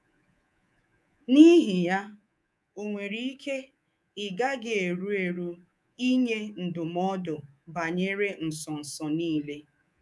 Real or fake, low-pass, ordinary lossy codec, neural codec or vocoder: fake; none; none; codec, 24 kHz, 3.1 kbps, DualCodec